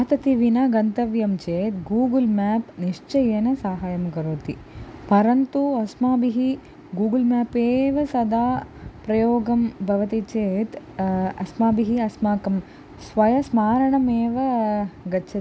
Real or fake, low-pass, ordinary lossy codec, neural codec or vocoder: real; none; none; none